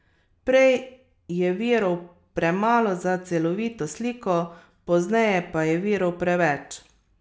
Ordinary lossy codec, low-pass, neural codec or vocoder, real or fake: none; none; none; real